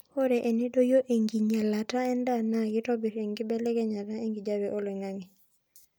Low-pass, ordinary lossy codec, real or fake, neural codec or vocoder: none; none; real; none